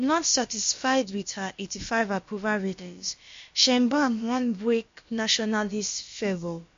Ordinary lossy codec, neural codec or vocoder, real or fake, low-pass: MP3, 48 kbps; codec, 16 kHz, about 1 kbps, DyCAST, with the encoder's durations; fake; 7.2 kHz